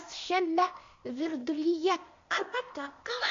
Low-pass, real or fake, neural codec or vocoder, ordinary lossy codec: 7.2 kHz; fake; codec, 16 kHz, 0.8 kbps, ZipCodec; MP3, 48 kbps